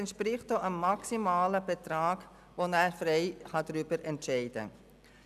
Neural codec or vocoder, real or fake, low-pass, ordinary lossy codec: none; real; 14.4 kHz; none